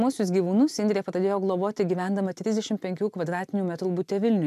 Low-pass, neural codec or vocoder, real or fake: 14.4 kHz; none; real